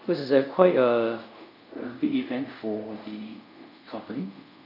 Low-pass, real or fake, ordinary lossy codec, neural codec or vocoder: 5.4 kHz; fake; none; codec, 24 kHz, 0.5 kbps, DualCodec